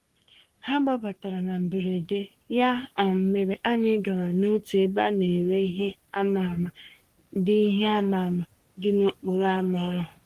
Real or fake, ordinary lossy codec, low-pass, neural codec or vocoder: fake; Opus, 24 kbps; 14.4 kHz; codec, 44.1 kHz, 3.4 kbps, Pupu-Codec